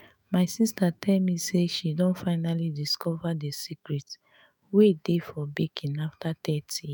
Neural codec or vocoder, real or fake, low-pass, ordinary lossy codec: autoencoder, 48 kHz, 128 numbers a frame, DAC-VAE, trained on Japanese speech; fake; none; none